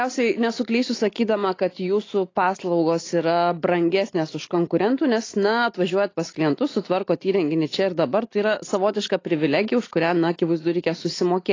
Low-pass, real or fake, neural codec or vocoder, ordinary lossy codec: 7.2 kHz; real; none; AAC, 32 kbps